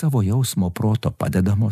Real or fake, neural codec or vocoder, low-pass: real; none; 14.4 kHz